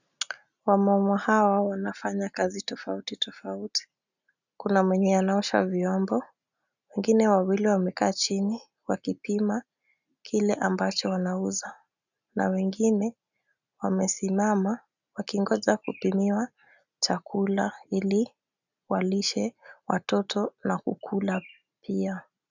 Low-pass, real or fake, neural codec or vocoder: 7.2 kHz; real; none